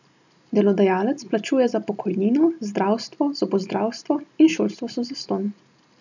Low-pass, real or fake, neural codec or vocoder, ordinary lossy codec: 7.2 kHz; real; none; none